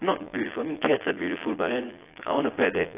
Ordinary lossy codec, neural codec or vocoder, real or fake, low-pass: AAC, 24 kbps; vocoder, 22.05 kHz, 80 mel bands, Vocos; fake; 3.6 kHz